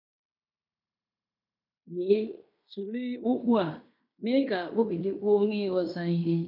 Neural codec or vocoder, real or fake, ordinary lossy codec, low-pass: codec, 16 kHz in and 24 kHz out, 0.9 kbps, LongCat-Audio-Codec, fine tuned four codebook decoder; fake; none; 5.4 kHz